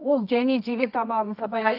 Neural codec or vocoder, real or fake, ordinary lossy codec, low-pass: codec, 24 kHz, 0.9 kbps, WavTokenizer, medium music audio release; fake; none; 5.4 kHz